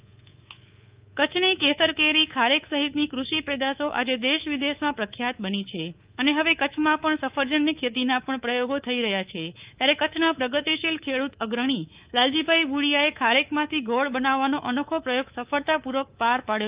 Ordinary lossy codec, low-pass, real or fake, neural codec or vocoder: Opus, 32 kbps; 3.6 kHz; fake; codec, 24 kHz, 3.1 kbps, DualCodec